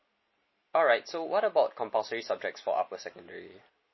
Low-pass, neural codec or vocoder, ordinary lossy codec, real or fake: 7.2 kHz; none; MP3, 24 kbps; real